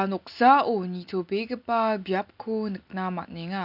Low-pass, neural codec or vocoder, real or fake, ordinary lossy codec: 5.4 kHz; none; real; none